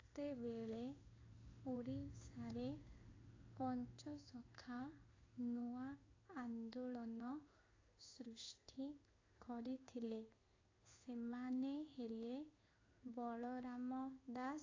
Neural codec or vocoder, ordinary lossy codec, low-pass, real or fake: codec, 16 kHz in and 24 kHz out, 1 kbps, XY-Tokenizer; AAC, 32 kbps; 7.2 kHz; fake